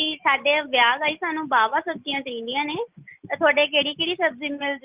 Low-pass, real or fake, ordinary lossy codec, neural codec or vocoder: 3.6 kHz; real; Opus, 24 kbps; none